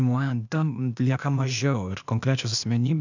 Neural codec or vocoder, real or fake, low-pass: codec, 16 kHz, 0.8 kbps, ZipCodec; fake; 7.2 kHz